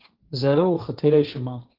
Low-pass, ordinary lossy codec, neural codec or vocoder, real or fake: 5.4 kHz; Opus, 32 kbps; codec, 16 kHz, 1.1 kbps, Voila-Tokenizer; fake